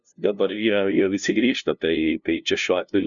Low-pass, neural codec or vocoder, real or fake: 7.2 kHz; codec, 16 kHz, 0.5 kbps, FunCodec, trained on LibriTTS, 25 frames a second; fake